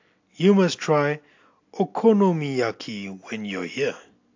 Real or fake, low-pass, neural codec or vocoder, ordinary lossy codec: real; 7.2 kHz; none; AAC, 48 kbps